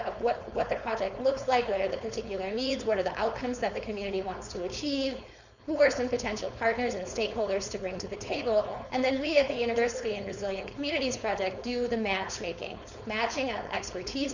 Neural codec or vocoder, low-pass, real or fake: codec, 16 kHz, 4.8 kbps, FACodec; 7.2 kHz; fake